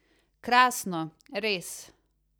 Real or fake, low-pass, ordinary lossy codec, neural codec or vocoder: real; none; none; none